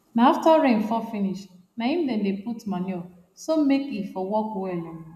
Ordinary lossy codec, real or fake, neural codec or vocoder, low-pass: none; real; none; 14.4 kHz